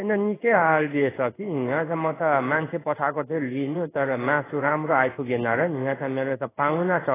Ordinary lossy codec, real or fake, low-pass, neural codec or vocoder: AAC, 16 kbps; fake; 3.6 kHz; codec, 16 kHz in and 24 kHz out, 1 kbps, XY-Tokenizer